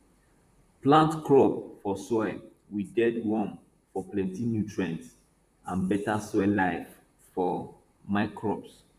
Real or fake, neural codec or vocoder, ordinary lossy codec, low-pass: fake; vocoder, 44.1 kHz, 128 mel bands, Pupu-Vocoder; none; 14.4 kHz